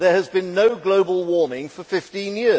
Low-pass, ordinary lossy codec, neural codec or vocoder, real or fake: none; none; none; real